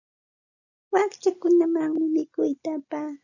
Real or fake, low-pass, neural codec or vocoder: real; 7.2 kHz; none